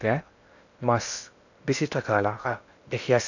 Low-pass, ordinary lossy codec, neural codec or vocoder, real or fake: 7.2 kHz; none; codec, 16 kHz in and 24 kHz out, 0.6 kbps, FocalCodec, streaming, 2048 codes; fake